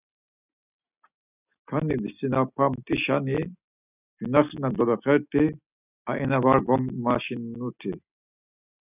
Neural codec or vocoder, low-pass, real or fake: none; 3.6 kHz; real